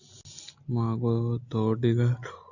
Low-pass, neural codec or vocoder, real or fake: 7.2 kHz; none; real